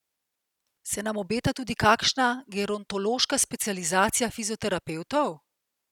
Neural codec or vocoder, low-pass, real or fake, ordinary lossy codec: none; 19.8 kHz; real; none